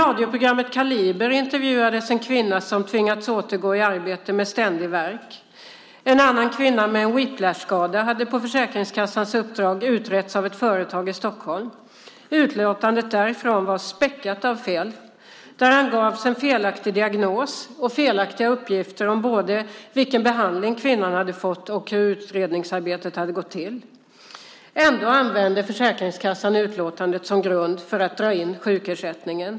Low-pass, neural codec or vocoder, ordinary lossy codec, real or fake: none; none; none; real